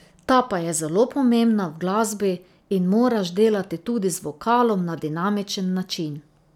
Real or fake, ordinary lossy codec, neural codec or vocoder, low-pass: real; none; none; 19.8 kHz